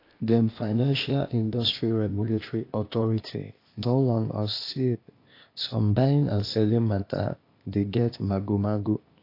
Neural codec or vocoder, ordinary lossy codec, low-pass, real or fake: codec, 16 kHz, 0.8 kbps, ZipCodec; AAC, 32 kbps; 5.4 kHz; fake